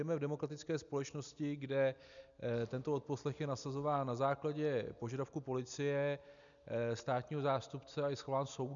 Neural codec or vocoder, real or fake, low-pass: none; real; 7.2 kHz